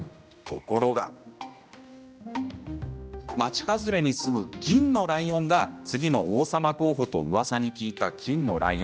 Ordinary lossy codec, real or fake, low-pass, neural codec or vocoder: none; fake; none; codec, 16 kHz, 1 kbps, X-Codec, HuBERT features, trained on general audio